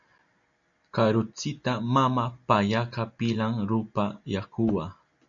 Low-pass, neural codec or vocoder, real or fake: 7.2 kHz; none; real